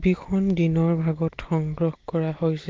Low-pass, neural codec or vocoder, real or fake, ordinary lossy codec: 7.2 kHz; none; real; Opus, 16 kbps